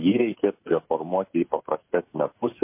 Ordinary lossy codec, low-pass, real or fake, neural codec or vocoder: MP3, 24 kbps; 3.6 kHz; fake; codec, 16 kHz, 16 kbps, FreqCodec, smaller model